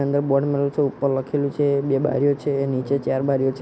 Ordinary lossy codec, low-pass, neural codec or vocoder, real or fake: none; none; none; real